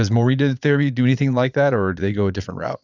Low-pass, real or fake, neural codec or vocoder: 7.2 kHz; fake; vocoder, 22.05 kHz, 80 mel bands, Vocos